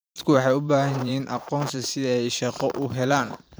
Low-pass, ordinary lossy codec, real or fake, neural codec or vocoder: none; none; fake; vocoder, 44.1 kHz, 128 mel bands every 512 samples, BigVGAN v2